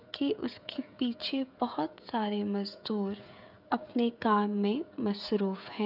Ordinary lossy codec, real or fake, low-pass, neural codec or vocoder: none; fake; 5.4 kHz; codec, 16 kHz, 16 kbps, FreqCodec, smaller model